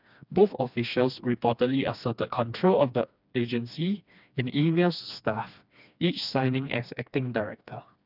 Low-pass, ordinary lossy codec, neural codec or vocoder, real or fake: 5.4 kHz; none; codec, 16 kHz, 2 kbps, FreqCodec, smaller model; fake